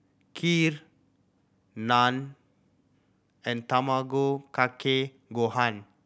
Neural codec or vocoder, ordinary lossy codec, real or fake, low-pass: none; none; real; none